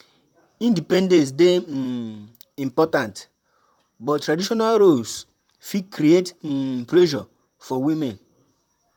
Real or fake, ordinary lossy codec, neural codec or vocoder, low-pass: fake; none; vocoder, 44.1 kHz, 128 mel bands, Pupu-Vocoder; 19.8 kHz